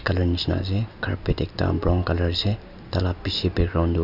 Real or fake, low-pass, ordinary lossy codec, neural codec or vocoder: real; 5.4 kHz; none; none